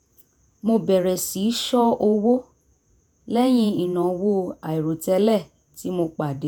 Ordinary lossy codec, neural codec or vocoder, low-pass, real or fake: none; vocoder, 48 kHz, 128 mel bands, Vocos; none; fake